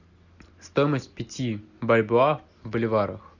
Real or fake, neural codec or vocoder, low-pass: real; none; 7.2 kHz